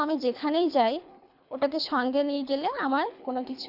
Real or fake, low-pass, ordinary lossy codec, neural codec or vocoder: fake; 5.4 kHz; none; codec, 24 kHz, 3 kbps, HILCodec